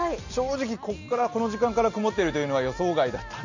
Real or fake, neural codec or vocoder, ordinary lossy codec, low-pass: real; none; none; 7.2 kHz